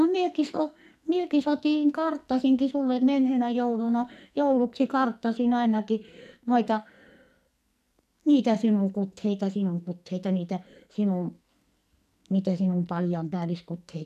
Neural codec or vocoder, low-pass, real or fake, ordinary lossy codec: codec, 44.1 kHz, 2.6 kbps, SNAC; 14.4 kHz; fake; none